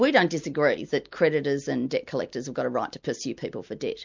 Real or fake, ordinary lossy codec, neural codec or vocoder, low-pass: real; MP3, 64 kbps; none; 7.2 kHz